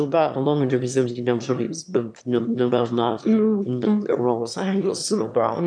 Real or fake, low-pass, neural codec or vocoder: fake; 9.9 kHz; autoencoder, 22.05 kHz, a latent of 192 numbers a frame, VITS, trained on one speaker